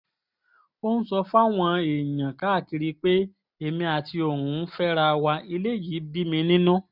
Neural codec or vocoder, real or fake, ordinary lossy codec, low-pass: none; real; none; 5.4 kHz